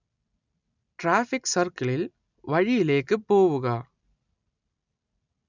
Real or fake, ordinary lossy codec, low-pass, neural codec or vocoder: real; none; 7.2 kHz; none